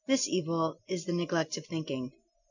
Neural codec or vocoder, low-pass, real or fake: none; 7.2 kHz; real